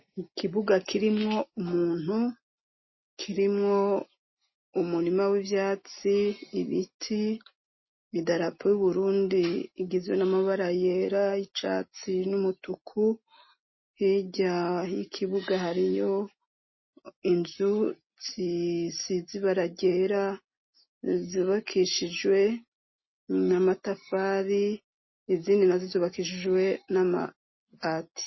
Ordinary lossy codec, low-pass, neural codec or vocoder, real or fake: MP3, 24 kbps; 7.2 kHz; none; real